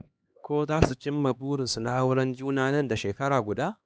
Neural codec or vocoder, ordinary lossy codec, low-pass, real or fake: codec, 16 kHz, 2 kbps, X-Codec, HuBERT features, trained on LibriSpeech; none; none; fake